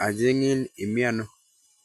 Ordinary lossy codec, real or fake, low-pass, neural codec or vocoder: AAC, 96 kbps; real; 14.4 kHz; none